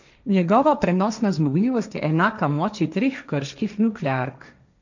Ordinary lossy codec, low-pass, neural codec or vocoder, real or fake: none; 7.2 kHz; codec, 16 kHz, 1.1 kbps, Voila-Tokenizer; fake